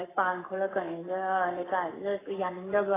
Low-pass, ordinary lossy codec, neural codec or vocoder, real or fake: 3.6 kHz; AAC, 16 kbps; codec, 44.1 kHz, 7.8 kbps, Pupu-Codec; fake